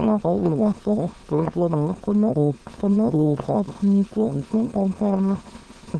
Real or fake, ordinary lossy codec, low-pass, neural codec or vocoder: fake; Opus, 16 kbps; 9.9 kHz; autoencoder, 22.05 kHz, a latent of 192 numbers a frame, VITS, trained on many speakers